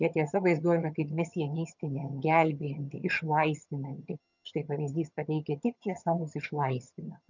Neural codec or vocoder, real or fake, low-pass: vocoder, 22.05 kHz, 80 mel bands, HiFi-GAN; fake; 7.2 kHz